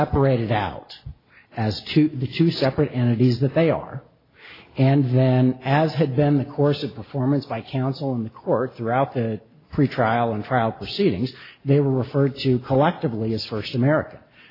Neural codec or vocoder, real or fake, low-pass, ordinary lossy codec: none; real; 5.4 kHz; AAC, 24 kbps